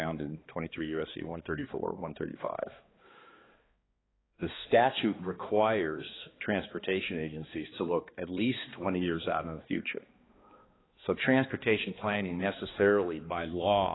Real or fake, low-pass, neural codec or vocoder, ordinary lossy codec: fake; 7.2 kHz; codec, 16 kHz, 2 kbps, X-Codec, HuBERT features, trained on balanced general audio; AAC, 16 kbps